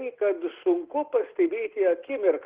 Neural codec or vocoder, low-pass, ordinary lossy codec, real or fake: none; 3.6 kHz; Opus, 16 kbps; real